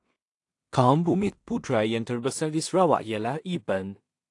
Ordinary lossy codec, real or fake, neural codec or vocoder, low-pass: AAC, 48 kbps; fake; codec, 16 kHz in and 24 kHz out, 0.4 kbps, LongCat-Audio-Codec, two codebook decoder; 10.8 kHz